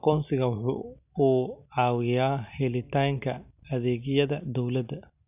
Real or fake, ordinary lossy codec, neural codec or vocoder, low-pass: real; none; none; 3.6 kHz